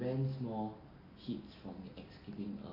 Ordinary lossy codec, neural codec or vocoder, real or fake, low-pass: none; none; real; 5.4 kHz